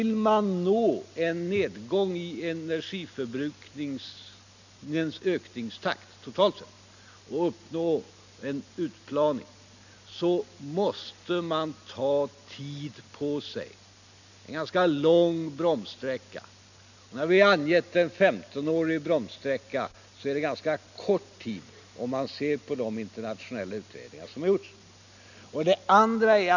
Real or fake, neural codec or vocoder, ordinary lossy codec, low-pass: real; none; none; 7.2 kHz